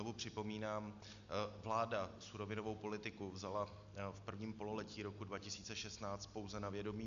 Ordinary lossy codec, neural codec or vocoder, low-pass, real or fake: MP3, 64 kbps; none; 7.2 kHz; real